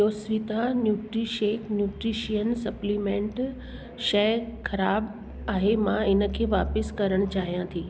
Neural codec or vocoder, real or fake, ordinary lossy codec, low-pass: none; real; none; none